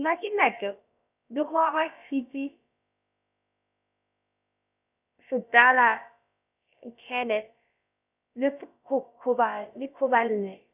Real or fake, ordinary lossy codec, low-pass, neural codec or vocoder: fake; none; 3.6 kHz; codec, 16 kHz, about 1 kbps, DyCAST, with the encoder's durations